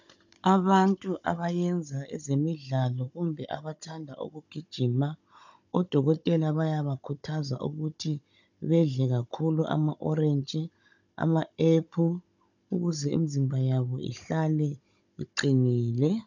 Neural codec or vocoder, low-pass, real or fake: codec, 16 kHz, 16 kbps, FunCodec, trained on Chinese and English, 50 frames a second; 7.2 kHz; fake